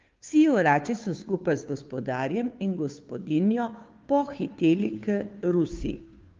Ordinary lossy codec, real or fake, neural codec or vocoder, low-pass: Opus, 32 kbps; fake; codec, 16 kHz, 2 kbps, FunCodec, trained on Chinese and English, 25 frames a second; 7.2 kHz